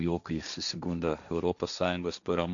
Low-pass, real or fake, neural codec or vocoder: 7.2 kHz; fake; codec, 16 kHz, 1.1 kbps, Voila-Tokenizer